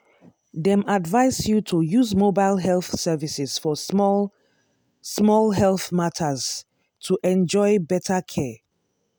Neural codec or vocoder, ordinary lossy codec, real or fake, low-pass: none; none; real; none